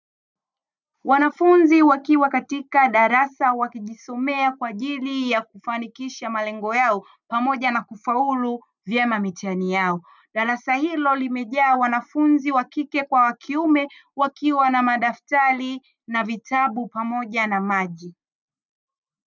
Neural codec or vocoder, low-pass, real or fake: none; 7.2 kHz; real